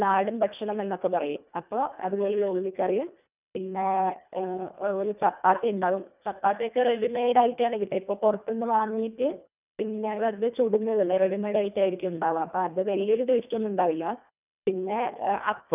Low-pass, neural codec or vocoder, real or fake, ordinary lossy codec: 3.6 kHz; codec, 24 kHz, 1.5 kbps, HILCodec; fake; none